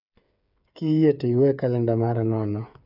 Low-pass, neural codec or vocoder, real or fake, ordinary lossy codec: 5.4 kHz; codec, 16 kHz, 8 kbps, FreqCodec, smaller model; fake; none